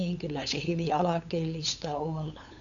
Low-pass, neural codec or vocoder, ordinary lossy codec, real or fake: 7.2 kHz; codec, 16 kHz, 8 kbps, FunCodec, trained on LibriTTS, 25 frames a second; none; fake